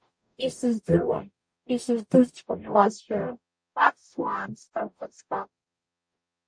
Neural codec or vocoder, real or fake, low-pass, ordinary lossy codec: codec, 44.1 kHz, 0.9 kbps, DAC; fake; 9.9 kHz; MP3, 48 kbps